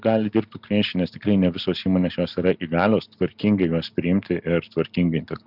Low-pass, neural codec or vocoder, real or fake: 5.4 kHz; none; real